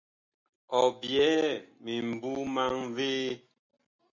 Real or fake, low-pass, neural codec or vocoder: real; 7.2 kHz; none